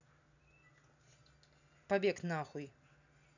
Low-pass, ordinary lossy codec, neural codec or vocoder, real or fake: 7.2 kHz; none; none; real